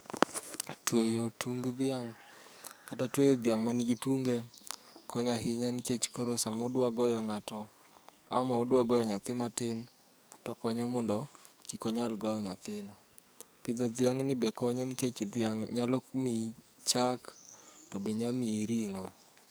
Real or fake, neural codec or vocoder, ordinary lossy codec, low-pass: fake; codec, 44.1 kHz, 2.6 kbps, SNAC; none; none